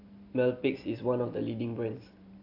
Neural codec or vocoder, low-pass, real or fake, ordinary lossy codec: none; 5.4 kHz; real; AAC, 48 kbps